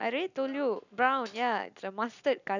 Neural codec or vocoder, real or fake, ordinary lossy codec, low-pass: none; real; none; 7.2 kHz